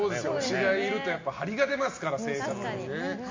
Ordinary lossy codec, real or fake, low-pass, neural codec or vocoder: MP3, 48 kbps; real; 7.2 kHz; none